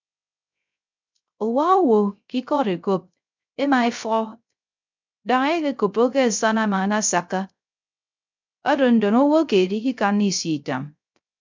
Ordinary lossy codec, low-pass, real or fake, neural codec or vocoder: MP3, 64 kbps; 7.2 kHz; fake; codec, 16 kHz, 0.3 kbps, FocalCodec